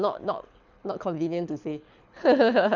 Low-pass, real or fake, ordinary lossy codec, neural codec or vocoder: 7.2 kHz; fake; none; codec, 24 kHz, 6 kbps, HILCodec